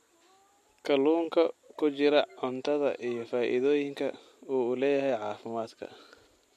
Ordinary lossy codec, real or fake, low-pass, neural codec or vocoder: MP3, 64 kbps; real; 14.4 kHz; none